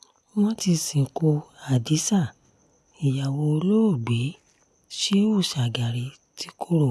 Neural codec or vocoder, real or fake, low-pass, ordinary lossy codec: none; real; none; none